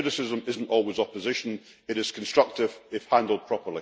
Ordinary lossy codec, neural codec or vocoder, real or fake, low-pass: none; none; real; none